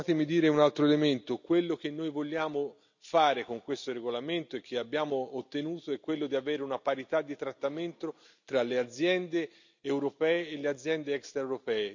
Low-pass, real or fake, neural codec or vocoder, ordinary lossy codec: 7.2 kHz; real; none; none